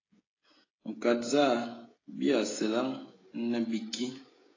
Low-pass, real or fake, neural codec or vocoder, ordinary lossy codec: 7.2 kHz; fake; codec, 16 kHz, 16 kbps, FreqCodec, smaller model; AAC, 32 kbps